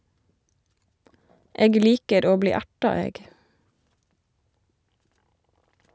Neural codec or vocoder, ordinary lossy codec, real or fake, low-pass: none; none; real; none